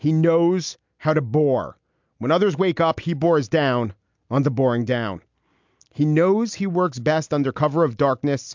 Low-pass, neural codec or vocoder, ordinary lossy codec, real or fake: 7.2 kHz; none; MP3, 64 kbps; real